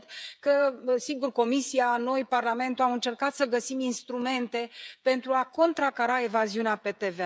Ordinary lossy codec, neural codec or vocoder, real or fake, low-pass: none; codec, 16 kHz, 16 kbps, FreqCodec, smaller model; fake; none